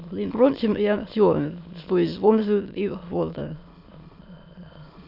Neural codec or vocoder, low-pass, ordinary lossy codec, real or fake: autoencoder, 22.05 kHz, a latent of 192 numbers a frame, VITS, trained on many speakers; 5.4 kHz; AAC, 48 kbps; fake